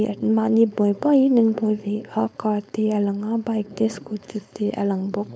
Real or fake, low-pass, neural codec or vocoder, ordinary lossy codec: fake; none; codec, 16 kHz, 4.8 kbps, FACodec; none